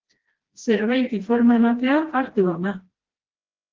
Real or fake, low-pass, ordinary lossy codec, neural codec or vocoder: fake; 7.2 kHz; Opus, 16 kbps; codec, 16 kHz, 1 kbps, FreqCodec, smaller model